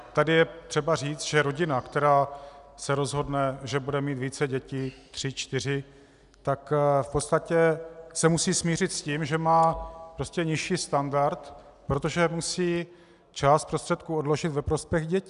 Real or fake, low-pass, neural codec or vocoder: real; 10.8 kHz; none